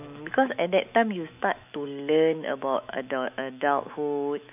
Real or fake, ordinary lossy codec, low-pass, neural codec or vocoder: fake; none; 3.6 kHz; autoencoder, 48 kHz, 128 numbers a frame, DAC-VAE, trained on Japanese speech